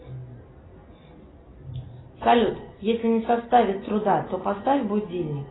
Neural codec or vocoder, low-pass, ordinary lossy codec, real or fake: none; 7.2 kHz; AAC, 16 kbps; real